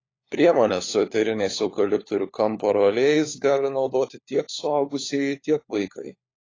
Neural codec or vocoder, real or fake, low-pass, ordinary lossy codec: codec, 16 kHz, 4 kbps, FunCodec, trained on LibriTTS, 50 frames a second; fake; 7.2 kHz; AAC, 32 kbps